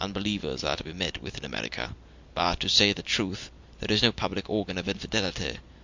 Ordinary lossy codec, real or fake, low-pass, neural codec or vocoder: MP3, 64 kbps; real; 7.2 kHz; none